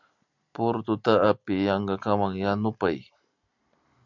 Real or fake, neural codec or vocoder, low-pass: real; none; 7.2 kHz